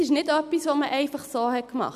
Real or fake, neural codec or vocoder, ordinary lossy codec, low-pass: real; none; none; 14.4 kHz